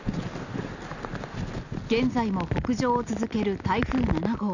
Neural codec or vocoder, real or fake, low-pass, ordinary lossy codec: none; real; 7.2 kHz; none